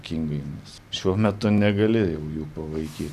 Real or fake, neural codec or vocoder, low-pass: real; none; 14.4 kHz